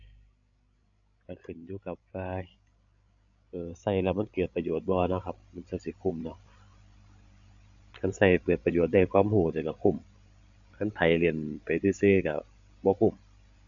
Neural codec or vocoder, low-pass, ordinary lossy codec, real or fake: codec, 16 kHz, 16 kbps, FreqCodec, larger model; 7.2 kHz; none; fake